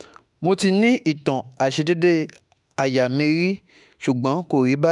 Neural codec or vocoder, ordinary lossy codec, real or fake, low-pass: autoencoder, 48 kHz, 32 numbers a frame, DAC-VAE, trained on Japanese speech; none; fake; 10.8 kHz